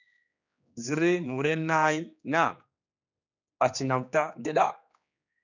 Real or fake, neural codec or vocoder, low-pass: fake; codec, 16 kHz, 2 kbps, X-Codec, HuBERT features, trained on general audio; 7.2 kHz